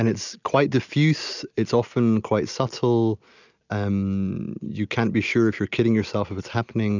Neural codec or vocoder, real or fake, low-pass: none; real; 7.2 kHz